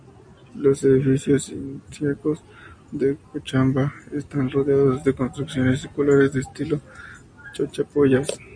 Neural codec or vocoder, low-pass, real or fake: none; 9.9 kHz; real